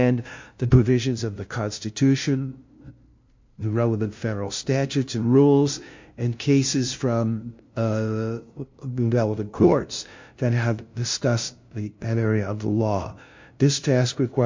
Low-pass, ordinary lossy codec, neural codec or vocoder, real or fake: 7.2 kHz; MP3, 48 kbps; codec, 16 kHz, 0.5 kbps, FunCodec, trained on LibriTTS, 25 frames a second; fake